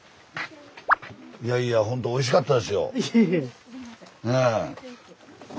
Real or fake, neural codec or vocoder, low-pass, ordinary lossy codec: real; none; none; none